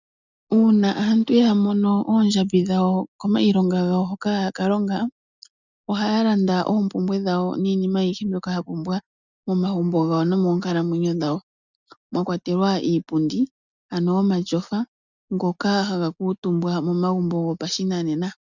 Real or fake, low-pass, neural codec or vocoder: real; 7.2 kHz; none